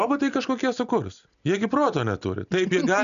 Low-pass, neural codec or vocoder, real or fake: 7.2 kHz; none; real